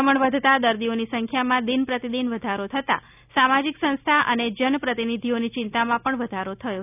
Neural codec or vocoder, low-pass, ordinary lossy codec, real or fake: none; 3.6 kHz; none; real